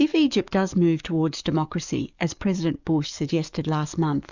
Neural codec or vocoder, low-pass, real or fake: codec, 44.1 kHz, 7.8 kbps, DAC; 7.2 kHz; fake